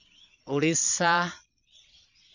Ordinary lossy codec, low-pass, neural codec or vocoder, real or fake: none; 7.2 kHz; vocoder, 22.05 kHz, 80 mel bands, Vocos; fake